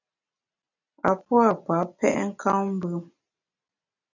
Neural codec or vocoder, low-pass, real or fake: none; 7.2 kHz; real